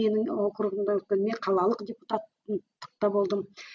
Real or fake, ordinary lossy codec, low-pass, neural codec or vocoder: real; none; 7.2 kHz; none